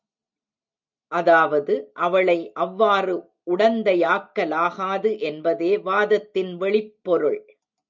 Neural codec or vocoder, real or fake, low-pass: none; real; 7.2 kHz